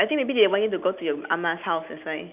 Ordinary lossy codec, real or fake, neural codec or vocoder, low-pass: none; real; none; 3.6 kHz